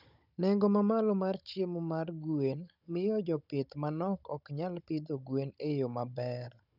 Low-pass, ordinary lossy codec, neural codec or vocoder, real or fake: 5.4 kHz; none; codec, 16 kHz, 16 kbps, FunCodec, trained on Chinese and English, 50 frames a second; fake